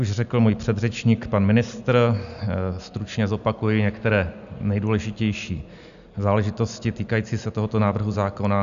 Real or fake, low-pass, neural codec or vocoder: real; 7.2 kHz; none